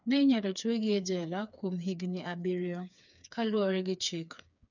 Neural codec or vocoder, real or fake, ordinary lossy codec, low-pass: codec, 16 kHz, 4 kbps, FreqCodec, smaller model; fake; none; 7.2 kHz